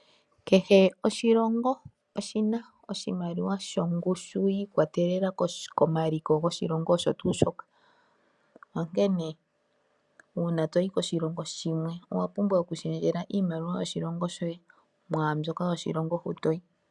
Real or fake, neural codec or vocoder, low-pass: real; none; 10.8 kHz